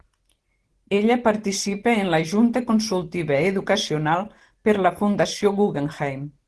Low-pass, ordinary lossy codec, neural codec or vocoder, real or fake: 10.8 kHz; Opus, 16 kbps; none; real